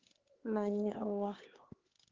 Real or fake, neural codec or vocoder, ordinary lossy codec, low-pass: fake; codec, 16 kHz, 4 kbps, X-Codec, HuBERT features, trained on LibriSpeech; Opus, 16 kbps; 7.2 kHz